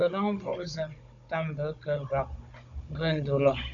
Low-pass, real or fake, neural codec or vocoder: 7.2 kHz; fake; codec, 16 kHz, 16 kbps, FunCodec, trained on Chinese and English, 50 frames a second